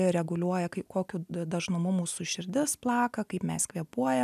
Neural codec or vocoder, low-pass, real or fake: none; 14.4 kHz; real